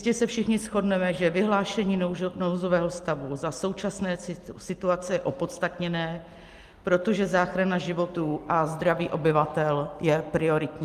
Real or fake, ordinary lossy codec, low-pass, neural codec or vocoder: real; Opus, 32 kbps; 14.4 kHz; none